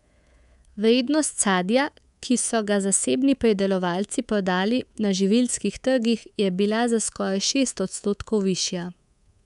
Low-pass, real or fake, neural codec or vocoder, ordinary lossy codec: 10.8 kHz; fake; codec, 24 kHz, 3.1 kbps, DualCodec; none